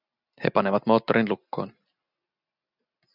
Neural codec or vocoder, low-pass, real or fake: none; 5.4 kHz; real